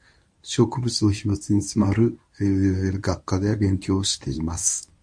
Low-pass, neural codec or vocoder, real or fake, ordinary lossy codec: 9.9 kHz; codec, 24 kHz, 0.9 kbps, WavTokenizer, medium speech release version 1; fake; MP3, 48 kbps